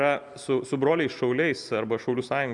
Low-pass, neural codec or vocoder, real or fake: 10.8 kHz; none; real